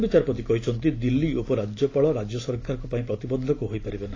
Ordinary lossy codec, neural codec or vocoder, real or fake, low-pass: AAC, 32 kbps; none; real; 7.2 kHz